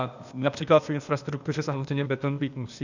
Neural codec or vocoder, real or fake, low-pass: codec, 16 kHz, 0.8 kbps, ZipCodec; fake; 7.2 kHz